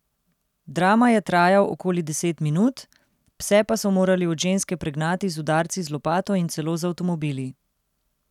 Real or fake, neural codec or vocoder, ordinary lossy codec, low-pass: real; none; none; 19.8 kHz